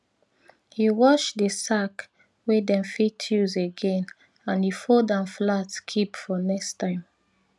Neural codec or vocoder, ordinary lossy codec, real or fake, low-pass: none; none; real; none